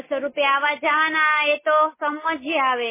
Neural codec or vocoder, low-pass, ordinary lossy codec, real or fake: none; 3.6 kHz; MP3, 16 kbps; real